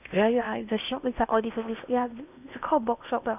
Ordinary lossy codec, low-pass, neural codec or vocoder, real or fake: none; 3.6 kHz; codec, 16 kHz in and 24 kHz out, 0.8 kbps, FocalCodec, streaming, 65536 codes; fake